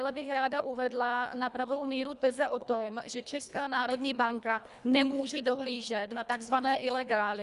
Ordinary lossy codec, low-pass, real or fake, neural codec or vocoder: Opus, 64 kbps; 10.8 kHz; fake; codec, 24 kHz, 1.5 kbps, HILCodec